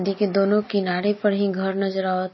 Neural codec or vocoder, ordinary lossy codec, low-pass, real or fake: none; MP3, 24 kbps; 7.2 kHz; real